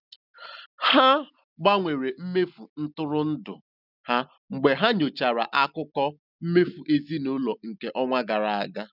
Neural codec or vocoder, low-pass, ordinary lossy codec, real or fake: none; 5.4 kHz; none; real